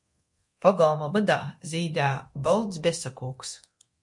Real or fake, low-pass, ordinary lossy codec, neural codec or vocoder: fake; 10.8 kHz; MP3, 48 kbps; codec, 24 kHz, 0.9 kbps, DualCodec